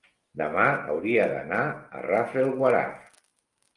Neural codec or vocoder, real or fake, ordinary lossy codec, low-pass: none; real; Opus, 32 kbps; 10.8 kHz